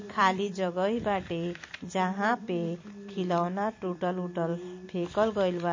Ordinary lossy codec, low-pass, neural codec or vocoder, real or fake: MP3, 32 kbps; 7.2 kHz; vocoder, 44.1 kHz, 128 mel bands every 256 samples, BigVGAN v2; fake